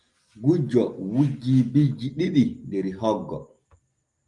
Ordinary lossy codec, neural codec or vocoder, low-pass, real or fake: Opus, 32 kbps; none; 10.8 kHz; real